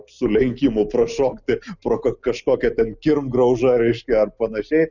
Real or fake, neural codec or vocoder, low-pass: real; none; 7.2 kHz